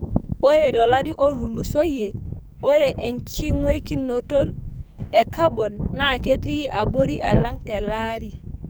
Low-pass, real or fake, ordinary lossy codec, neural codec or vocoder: none; fake; none; codec, 44.1 kHz, 2.6 kbps, SNAC